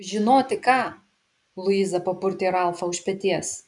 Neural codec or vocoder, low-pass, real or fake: none; 10.8 kHz; real